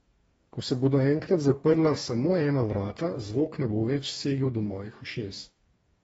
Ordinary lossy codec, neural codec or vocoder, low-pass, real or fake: AAC, 24 kbps; codec, 44.1 kHz, 2.6 kbps, DAC; 19.8 kHz; fake